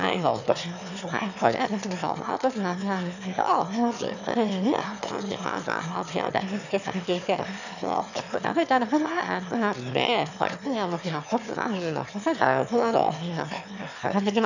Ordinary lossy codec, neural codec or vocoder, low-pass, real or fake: none; autoencoder, 22.05 kHz, a latent of 192 numbers a frame, VITS, trained on one speaker; 7.2 kHz; fake